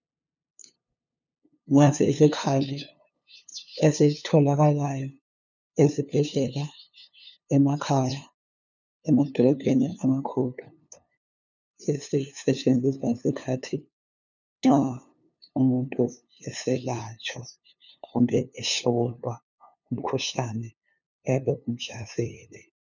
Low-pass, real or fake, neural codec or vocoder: 7.2 kHz; fake; codec, 16 kHz, 2 kbps, FunCodec, trained on LibriTTS, 25 frames a second